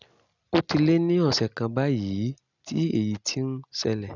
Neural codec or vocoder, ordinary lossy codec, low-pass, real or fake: none; none; 7.2 kHz; real